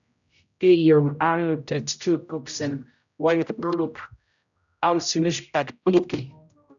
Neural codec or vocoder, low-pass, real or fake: codec, 16 kHz, 0.5 kbps, X-Codec, HuBERT features, trained on general audio; 7.2 kHz; fake